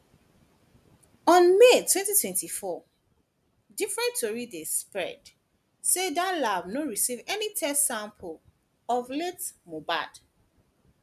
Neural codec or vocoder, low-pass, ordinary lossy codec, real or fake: none; 14.4 kHz; none; real